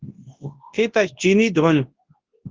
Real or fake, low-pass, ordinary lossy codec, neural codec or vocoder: fake; 7.2 kHz; Opus, 16 kbps; codec, 24 kHz, 0.9 kbps, WavTokenizer, large speech release